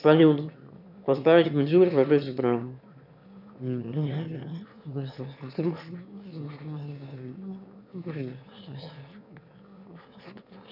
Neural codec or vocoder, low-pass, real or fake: autoencoder, 22.05 kHz, a latent of 192 numbers a frame, VITS, trained on one speaker; 5.4 kHz; fake